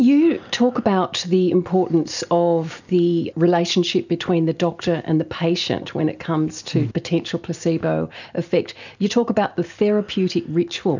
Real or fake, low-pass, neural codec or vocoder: real; 7.2 kHz; none